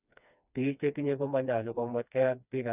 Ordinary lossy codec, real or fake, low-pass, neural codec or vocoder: none; fake; 3.6 kHz; codec, 16 kHz, 2 kbps, FreqCodec, smaller model